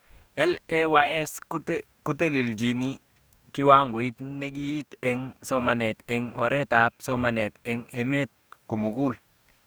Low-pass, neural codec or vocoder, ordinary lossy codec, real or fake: none; codec, 44.1 kHz, 2.6 kbps, DAC; none; fake